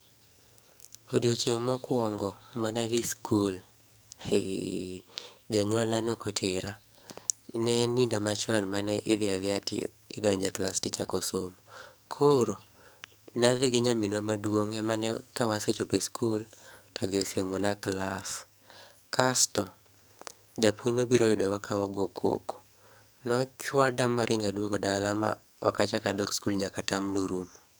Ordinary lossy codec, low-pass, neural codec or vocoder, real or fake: none; none; codec, 44.1 kHz, 2.6 kbps, SNAC; fake